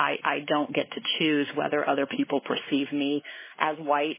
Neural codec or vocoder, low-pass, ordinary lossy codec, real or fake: codec, 16 kHz, 4 kbps, X-Codec, WavLM features, trained on Multilingual LibriSpeech; 3.6 kHz; MP3, 16 kbps; fake